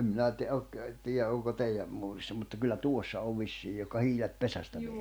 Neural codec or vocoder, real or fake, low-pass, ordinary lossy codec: none; real; none; none